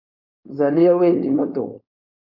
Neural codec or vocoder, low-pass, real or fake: codec, 16 kHz, 4.8 kbps, FACodec; 5.4 kHz; fake